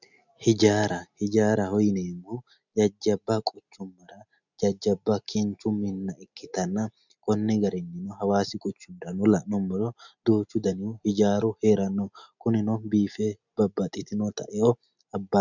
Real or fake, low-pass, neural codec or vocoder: real; 7.2 kHz; none